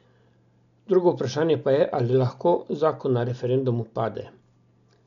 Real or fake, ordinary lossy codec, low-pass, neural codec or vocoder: real; none; 7.2 kHz; none